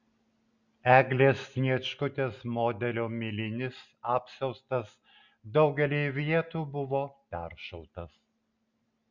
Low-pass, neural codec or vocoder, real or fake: 7.2 kHz; none; real